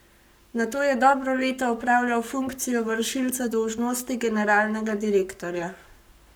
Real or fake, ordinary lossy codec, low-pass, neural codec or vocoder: fake; none; none; codec, 44.1 kHz, 7.8 kbps, Pupu-Codec